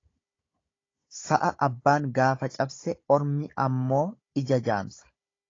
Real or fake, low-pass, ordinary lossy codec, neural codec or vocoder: fake; 7.2 kHz; AAC, 32 kbps; codec, 16 kHz, 16 kbps, FunCodec, trained on Chinese and English, 50 frames a second